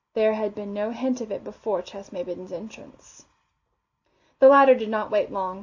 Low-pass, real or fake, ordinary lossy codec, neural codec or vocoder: 7.2 kHz; real; AAC, 48 kbps; none